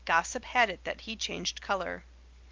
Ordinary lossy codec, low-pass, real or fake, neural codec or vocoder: Opus, 24 kbps; 7.2 kHz; real; none